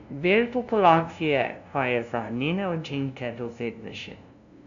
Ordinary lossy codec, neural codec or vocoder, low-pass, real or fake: none; codec, 16 kHz, 0.5 kbps, FunCodec, trained on LibriTTS, 25 frames a second; 7.2 kHz; fake